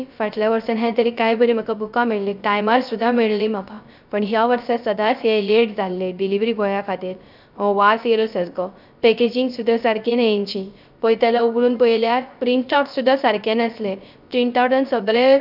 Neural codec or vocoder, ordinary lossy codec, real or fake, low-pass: codec, 16 kHz, 0.3 kbps, FocalCodec; none; fake; 5.4 kHz